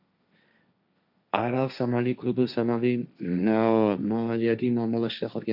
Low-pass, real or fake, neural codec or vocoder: 5.4 kHz; fake; codec, 16 kHz, 1.1 kbps, Voila-Tokenizer